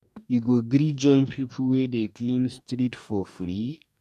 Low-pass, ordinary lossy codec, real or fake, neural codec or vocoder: 14.4 kHz; none; fake; codec, 44.1 kHz, 2.6 kbps, DAC